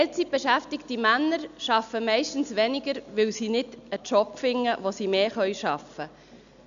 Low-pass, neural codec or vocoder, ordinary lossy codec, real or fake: 7.2 kHz; none; none; real